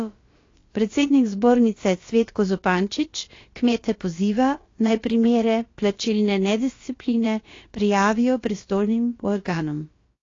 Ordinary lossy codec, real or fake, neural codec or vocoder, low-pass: AAC, 32 kbps; fake; codec, 16 kHz, about 1 kbps, DyCAST, with the encoder's durations; 7.2 kHz